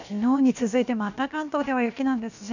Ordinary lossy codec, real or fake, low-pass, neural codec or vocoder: none; fake; 7.2 kHz; codec, 16 kHz, about 1 kbps, DyCAST, with the encoder's durations